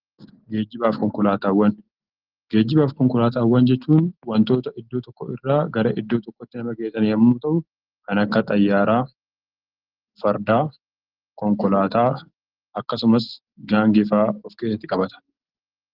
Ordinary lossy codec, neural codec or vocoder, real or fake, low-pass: Opus, 16 kbps; none; real; 5.4 kHz